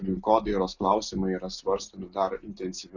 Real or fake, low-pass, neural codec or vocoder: real; 7.2 kHz; none